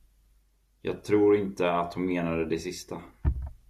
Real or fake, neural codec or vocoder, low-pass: real; none; 14.4 kHz